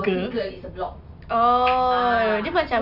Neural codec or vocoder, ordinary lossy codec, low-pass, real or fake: none; none; 5.4 kHz; real